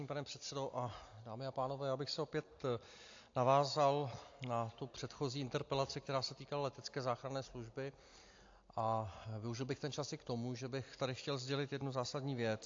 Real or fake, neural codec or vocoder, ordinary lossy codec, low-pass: real; none; AAC, 48 kbps; 7.2 kHz